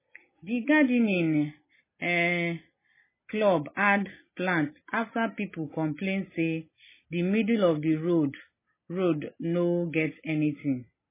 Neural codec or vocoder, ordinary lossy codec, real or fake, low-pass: none; MP3, 16 kbps; real; 3.6 kHz